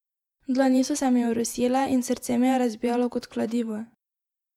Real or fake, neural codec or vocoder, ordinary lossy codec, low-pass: fake; vocoder, 48 kHz, 128 mel bands, Vocos; MP3, 96 kbps; 19.8 kHz